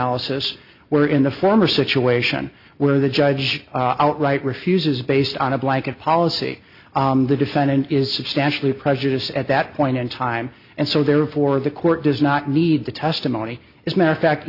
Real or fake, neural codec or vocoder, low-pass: real; none; 5.4 kHz